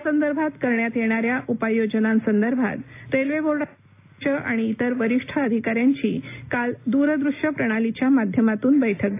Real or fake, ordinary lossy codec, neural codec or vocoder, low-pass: real; AAC, 24 kbps; none; 3.6 kHz